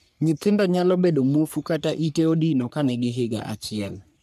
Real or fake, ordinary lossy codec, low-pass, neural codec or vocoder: fake; none; 14.4 kHz; codec, 44.1 kHz, 3.4 kbps, Pupu-Codec